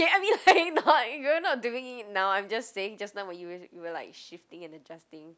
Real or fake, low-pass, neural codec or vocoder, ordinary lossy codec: real; none; none; none